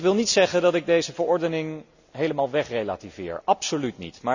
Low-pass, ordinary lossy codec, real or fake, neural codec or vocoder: 7.2 kHz; none; real; none